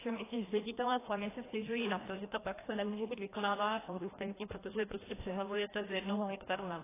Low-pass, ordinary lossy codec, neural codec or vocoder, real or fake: 3.6 kHz; AAC, 16 kbps; codec, 16 kHz, 1 kbps, FreqCodec, larger model; fake